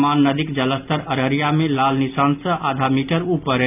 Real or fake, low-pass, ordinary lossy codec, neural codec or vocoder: real; 3.6 kHz; none; none